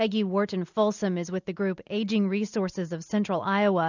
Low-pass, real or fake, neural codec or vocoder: 7.2 kHz; real; none